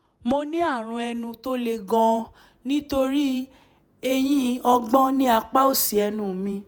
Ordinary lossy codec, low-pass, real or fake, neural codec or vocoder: none; none; fake; vocoder, 48 kHz, 128 mel bands, Vocos